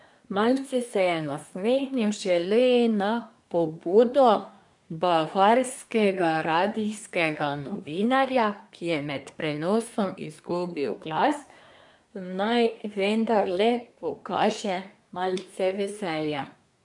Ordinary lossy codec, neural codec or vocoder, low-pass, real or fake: none; codec, 24 kHz, 1 kbps, SNAC; 10.8 kHz; fake